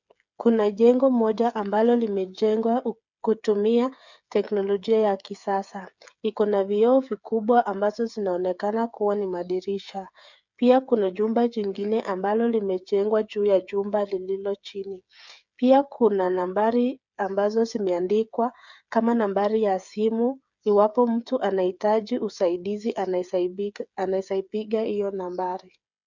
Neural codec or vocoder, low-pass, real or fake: codec, 16 kHz, 8 kbps, FreqCodec, smaller model; 7.2 kHz; fake